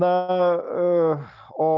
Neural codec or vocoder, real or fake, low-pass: none; real; 7.2 kHz